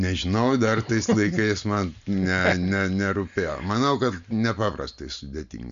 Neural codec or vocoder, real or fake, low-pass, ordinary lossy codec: none; real; 7.2 kHz; MP3, 64 kbps